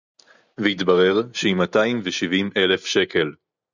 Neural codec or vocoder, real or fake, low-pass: none; real; 7.2 kHz